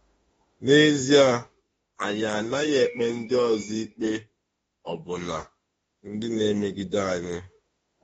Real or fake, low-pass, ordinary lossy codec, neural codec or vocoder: fake; 19.8 kHz; AAC, 24 kbps; autoencoder, 48 kHz, 32 numbers a frame, DAC-VAE, trained on Japanese speech